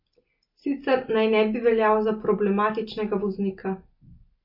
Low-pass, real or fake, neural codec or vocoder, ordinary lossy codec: 5.4 kHz; real; none; MP3, 32 kbps